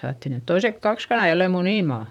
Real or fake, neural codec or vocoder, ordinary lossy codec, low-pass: fake; vocoder, 44.1 kHz, 128 mel bands, Pupu-Vocoder; none; 19.8 kHz